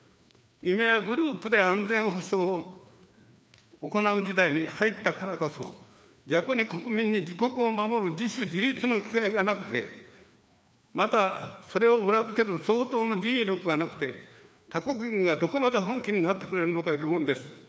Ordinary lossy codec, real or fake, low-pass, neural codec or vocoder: none; fake; none; codec, 16 kHz, 2 kbps, FreqCodec, larger model